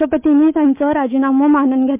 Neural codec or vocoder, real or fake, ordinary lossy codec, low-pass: autoencoder, 48 kHz, 128 numbers a frame, DAC-VAE, trained on Japanese speech; fake; MP3, 32 kbps; 3.6 kHz